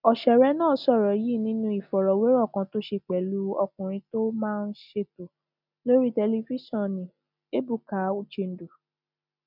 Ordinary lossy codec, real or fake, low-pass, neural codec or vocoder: none; real; 5.4 kHz; none